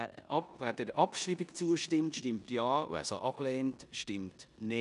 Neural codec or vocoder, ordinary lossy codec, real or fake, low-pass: codec, 16 kHz in and 24 kHz out, 0.9 kbps, LongCat-Audio-Codec, four codebook decoder; none; fake; 10.8 kHz